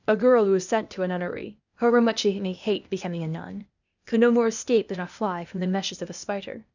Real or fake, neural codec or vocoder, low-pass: fake; codec, 16 kHz, 0.8 kbps, ZipCodec; 7.2 kHz